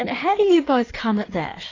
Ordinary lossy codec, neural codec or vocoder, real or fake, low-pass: AAC, 32 kbps; codec, 16 kHz in and 24 kHz out, 1.1 kbps, FireRedTTS-2 codec; fake; 7.2 kHz